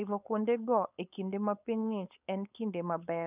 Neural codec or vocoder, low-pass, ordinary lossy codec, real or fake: codec, 16 kHz, 4.8 kbps, FACodec; 3.6 kHz; none; fake